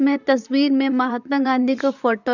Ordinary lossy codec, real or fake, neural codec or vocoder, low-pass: none; fake; vocoder, 44.1 kHz, 128 mel bands, Pupu-Vocoder; 7.2 kHz